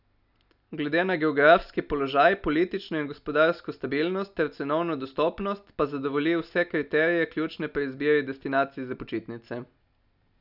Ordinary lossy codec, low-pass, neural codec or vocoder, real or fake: none; 5.4 kHz; none; real